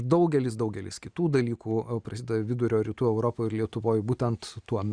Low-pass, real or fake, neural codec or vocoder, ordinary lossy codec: 9.9 kHz; real; none; Opus, 64 kbps